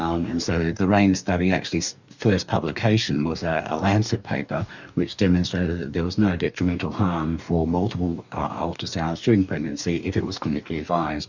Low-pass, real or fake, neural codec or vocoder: 7.2 kHz; fake; codec, 44.1 kHz, 2.6 kbps, DAC